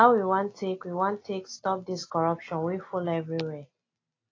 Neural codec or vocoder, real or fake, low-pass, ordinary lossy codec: none; real; 7.2 kHz; AAC, 32 kbps